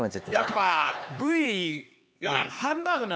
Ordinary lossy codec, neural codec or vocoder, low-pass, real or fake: none; codec, 16 kHz, 4 kbps, X-Codec, HuBERT features, trained on LibriSpeech; none; fake